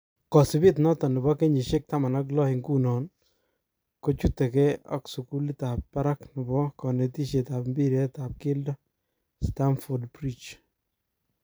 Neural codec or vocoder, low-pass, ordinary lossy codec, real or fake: vocoder, 44.1 kHz, 128 mel bands every 256 samples, BigVGAN v2; none; none; fake